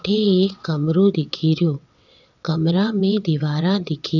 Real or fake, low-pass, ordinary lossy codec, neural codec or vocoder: fake; 7.2 kHz; none; vocoder, 22.05 kHz, 80 mel bands, WaveNeXt